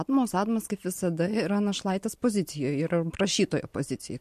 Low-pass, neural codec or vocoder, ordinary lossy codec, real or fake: 14.4 kHz; none; MP3, 64 kbps; real